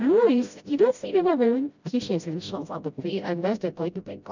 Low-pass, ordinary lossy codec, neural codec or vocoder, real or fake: 7.2 kHz; none; codec, 16 kHz, 0.5 kbps, FreqCodec, smaller model; fake